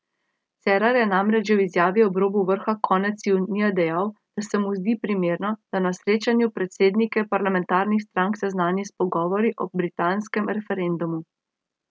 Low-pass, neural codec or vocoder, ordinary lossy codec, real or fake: none; none; none; real